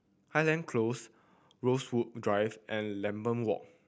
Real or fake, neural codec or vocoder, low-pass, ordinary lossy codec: real; none; none; none